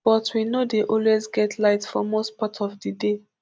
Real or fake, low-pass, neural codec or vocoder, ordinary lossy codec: real; none; none; none